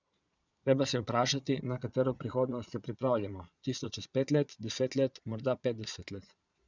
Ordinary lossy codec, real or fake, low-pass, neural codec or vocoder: none; fake; 7.2 kHz; vocoder, 22.05 kHz, 80 mel bands, Vocos